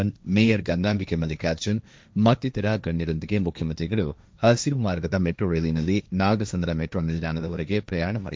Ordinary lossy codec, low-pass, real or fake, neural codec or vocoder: none; none; fake; codec, 16 kHz, 1.1 kbps, Voila-Tokenizer